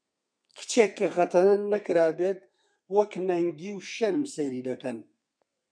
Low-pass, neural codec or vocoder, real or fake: 9.9 kHz; codec, 32 kHz, 1.9 kbps, SNAC; fake